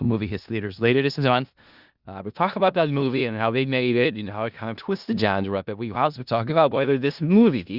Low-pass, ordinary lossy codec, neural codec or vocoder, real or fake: 5.4 kHz; Opus, 64 kbps; codec, 16 kHz in and 24 kHz out, 0.4 kbps, LongCat-Audio-Codec, four codebook decoder; fake